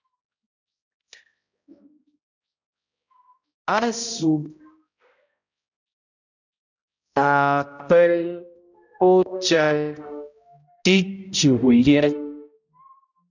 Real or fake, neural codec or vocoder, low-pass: fake; codec, 16 kHz, 0.5 kbps, X-Codec, HuBERT features, trained on balanced general audio; 7.2 kHz